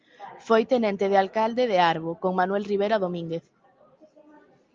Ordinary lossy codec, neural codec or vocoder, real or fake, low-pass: Opus, 24 kbps; none; real; 7.2 kHz